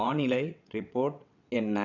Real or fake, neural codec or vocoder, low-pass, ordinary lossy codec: fake; vocoder, 22.05 kHz, 80 mel bands, WaveNeXt; 7.2 kHz; none